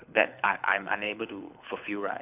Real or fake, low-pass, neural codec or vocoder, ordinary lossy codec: fake; 3.6 kHz; codec, 24 kHz, 6 kbps, HILCodec; none